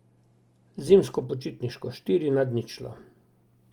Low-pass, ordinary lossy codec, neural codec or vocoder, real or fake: 19.8 kHz; Opus, 32 kbps; none; real